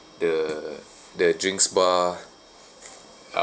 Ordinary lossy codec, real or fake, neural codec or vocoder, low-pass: none; real; none; none